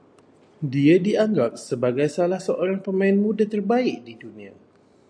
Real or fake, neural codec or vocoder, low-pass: real; none; 9.9 kHz